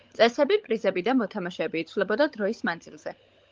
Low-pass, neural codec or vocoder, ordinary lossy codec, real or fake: 7.2 kHz; codec, 16 kHz, 16 kbps, FunCodec, trained on LibriTTS, 50 frames a second; Opus, 32 kbps; fake